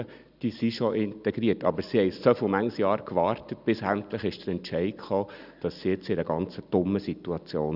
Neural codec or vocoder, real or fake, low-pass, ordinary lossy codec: none; real; 5.4 kHz; none